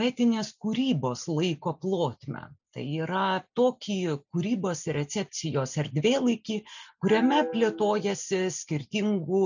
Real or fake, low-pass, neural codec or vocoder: real; 7.2 kHz; none